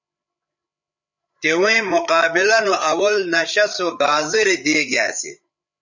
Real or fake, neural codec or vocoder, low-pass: fake; codec, 16 kHz, 8 kbps, FreqCodec, larger model; 7.2 kHz